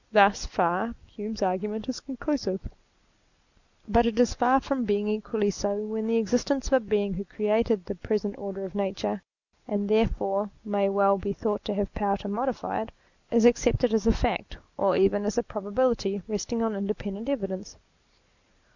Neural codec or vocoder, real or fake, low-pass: none; real; 7.2 kHz